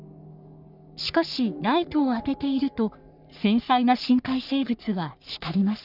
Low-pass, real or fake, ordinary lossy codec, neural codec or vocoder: 5.4 kHz; fake; none; codec, 44.1 kHz, 3.4 kbps, Pupu-Codec